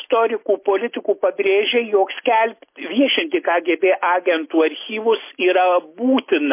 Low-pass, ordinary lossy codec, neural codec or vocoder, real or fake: 3.6 kHz; MP3, 24 kbps; none; real